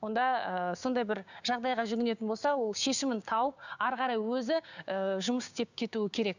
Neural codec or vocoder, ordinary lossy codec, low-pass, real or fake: codec, 16 kHz, 6 kbps, DAC; none; 7.2 kHz; fake